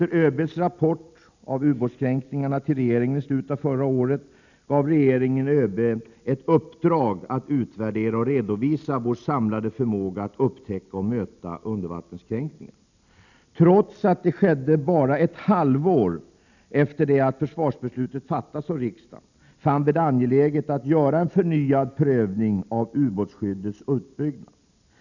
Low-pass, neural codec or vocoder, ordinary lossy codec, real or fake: 7.2 kHz; none; none; real